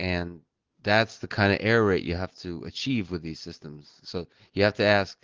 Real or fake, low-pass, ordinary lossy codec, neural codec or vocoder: real; 7.2 kHz; Opus, 16 kbps; none